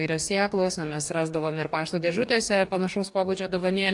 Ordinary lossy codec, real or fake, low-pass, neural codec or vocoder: AAC, 64 kbps; fake; 10.8 kHz; codec, 44.1 kHz, 2.6 kbps, DAC